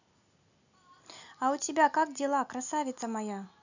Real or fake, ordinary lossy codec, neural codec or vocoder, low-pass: real; none; none; 7.2 kHz